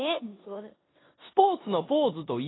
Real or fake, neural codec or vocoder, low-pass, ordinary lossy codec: fake; codec, 16 kHz in and 24 kHz out, 0.9 kbps, LongCat-Audio-Codec, four codebook decoder; 7.2 kHz; AAC, 16 kbps